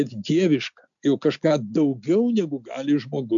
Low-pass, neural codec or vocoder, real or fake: 7.2 kHz; none; real